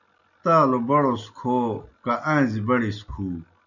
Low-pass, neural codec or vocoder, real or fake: 7.2 kHz; none; real